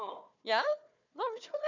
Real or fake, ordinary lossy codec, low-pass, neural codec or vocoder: fake; none; 7.2 kHz; codec, 16 kHz, 4 kbps, FunCodec, trained on Chinese and English, 50 frames a second